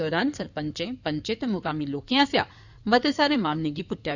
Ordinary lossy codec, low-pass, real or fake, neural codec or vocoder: none; 7.2 kHz; fake; codec, 16 kHz in and 24 kHz out, 2.2 kbps, FireRedTTS-2 codec